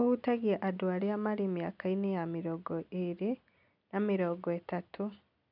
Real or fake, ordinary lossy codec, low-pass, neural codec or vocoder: real; none; 5.4 kHz; none